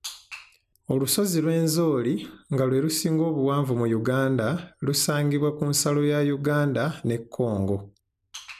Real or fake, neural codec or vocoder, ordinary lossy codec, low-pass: real; none; none; 14.4 kHz